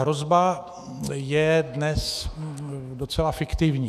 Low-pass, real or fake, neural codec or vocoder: 14.4 kHz; fake; autoencoder, 48 kHz, 128 numbers a frame, DAC-VAE, trained on Japanese speech